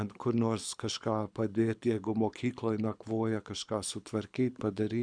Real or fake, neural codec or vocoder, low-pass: fake; codec, 24 kHz, 6 kbps, HILCodec; 9.9 kHz